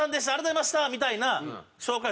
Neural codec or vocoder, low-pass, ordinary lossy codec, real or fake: none; none; none; real